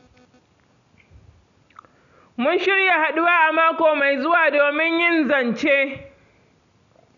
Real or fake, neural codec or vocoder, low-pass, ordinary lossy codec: real; none; 7.2 kHz; none